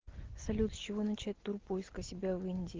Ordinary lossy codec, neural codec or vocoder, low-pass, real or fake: Opus, 16 kbps; none; 7.2 kHz; real